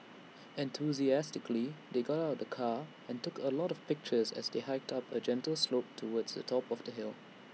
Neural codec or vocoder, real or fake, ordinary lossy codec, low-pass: none; real; none; none